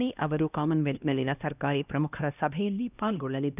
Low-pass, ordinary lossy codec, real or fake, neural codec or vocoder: 3.6 kHz; none; fake; codec, 16 kHz, 1 kbps, X-Codec, HuBERT features, trained on LibriSpeech